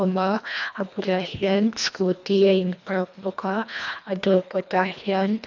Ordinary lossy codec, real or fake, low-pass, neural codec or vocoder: none; fake; 7.2 kHz; codec, 24 kHz, 1.5 kbps, HILCodec